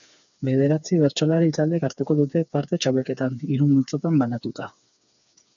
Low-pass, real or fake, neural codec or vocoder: 7.2 kHz; fake; codec, 16 kHz, 4 kbps, FreqCodec, smaller model